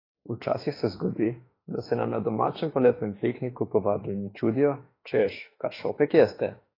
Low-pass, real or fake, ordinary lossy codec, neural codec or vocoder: 5.4 kHz; fake; AAC, 24 kbps; autoencoder, 48 kHz, 32 numbers a frame, DAC-VAE, trained on Japanese speech